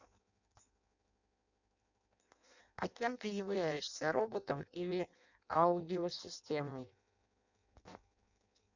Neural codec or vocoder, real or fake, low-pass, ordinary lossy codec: codec, 16 kHz in and 24 kHz out, 0.6 kbps, FireRedTTS-2 codec; fake; 7.2 kHz; none